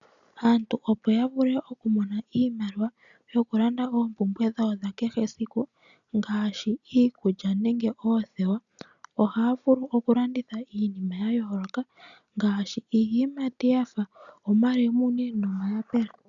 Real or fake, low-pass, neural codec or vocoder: real; 7.2 kHz; none